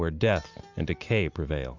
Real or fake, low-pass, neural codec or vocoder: real; 7.2 kHz; none